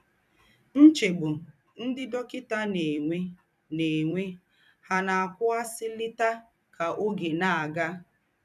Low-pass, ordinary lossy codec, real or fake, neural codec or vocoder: 14.4 kHz; none; fake; vocoder, 44.1 kHz, 128 mel bands every 256 samples, BigVGAN v2